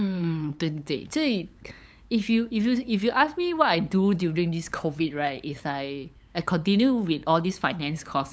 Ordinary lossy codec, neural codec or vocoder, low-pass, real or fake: none; codec, 16 kHz, 8 kbps, FunCodec, trained on LibriTTS, 25 frames a second; none; fake